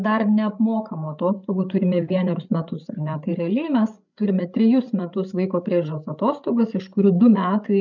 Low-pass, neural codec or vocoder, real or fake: 7.2 kHz; codec, 16 kHz, 8 kbps, FreqCodec, larger model; fake